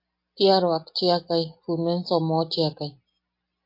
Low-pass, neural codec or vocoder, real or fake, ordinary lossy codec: 5.4 kHz; none; real; MP3, 32 kbps